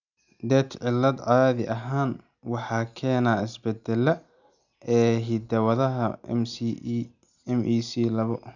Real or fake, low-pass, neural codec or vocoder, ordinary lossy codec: real; 7.2 kHz; none; none